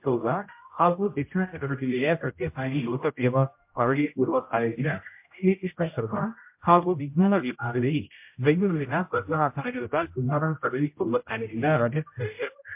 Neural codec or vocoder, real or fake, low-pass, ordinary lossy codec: codec, 16 kHz, 0.5 kbps, X-Codec, HuBERT features, trained on general audio; fake; 3.6 kHz; MP3, 32 kbps